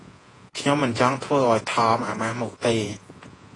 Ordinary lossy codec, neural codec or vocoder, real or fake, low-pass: AAC, 32 kbps; vocoder, 48 kHz, 128 mel bands, Vocos; fake; 10.8 kHz